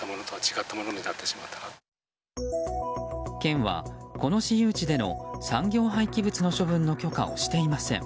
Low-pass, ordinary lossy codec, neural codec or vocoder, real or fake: none; none; none; real